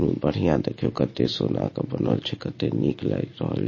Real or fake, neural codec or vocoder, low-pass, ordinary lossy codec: real; none; 7.2 kHz; MP3, 32 kbps